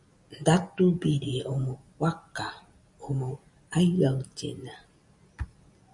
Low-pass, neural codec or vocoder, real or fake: 10.8 kHz; none; real